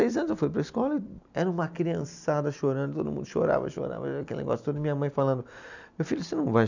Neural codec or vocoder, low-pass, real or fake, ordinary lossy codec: none; 7.2 kHz; real; none